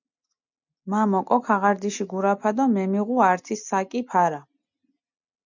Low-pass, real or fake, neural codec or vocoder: 7.2 kHz; real; none